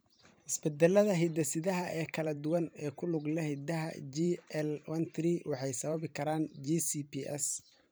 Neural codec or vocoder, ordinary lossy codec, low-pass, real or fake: vocoder, 44.1 kHz, 128 mel bands every 512 samples, BigVGAN v2; none; none; fake